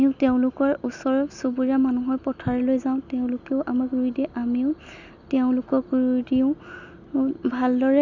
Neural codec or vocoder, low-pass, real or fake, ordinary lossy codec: none; 7.2 kHz; real; none